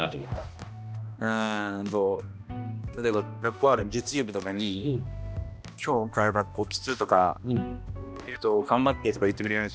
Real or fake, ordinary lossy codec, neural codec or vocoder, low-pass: fake; none; codec, 16 kHz, 1 kbps, X-Codec, HuBERT features, trained on balanced general audio; none